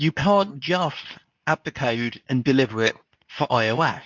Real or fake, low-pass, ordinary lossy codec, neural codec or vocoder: fake; 7.2 kHz; MP3, 64 kbps; codec, 24 kHz, 0.9 kbps, WavTokenizer, medium speech release version 2